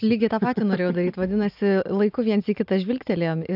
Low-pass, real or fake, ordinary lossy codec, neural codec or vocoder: 5.4 kHz; real; AAC, 48 kbps; none